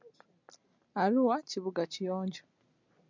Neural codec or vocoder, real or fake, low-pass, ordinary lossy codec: none; real; 7.2 kHz; MP3, 64 kbps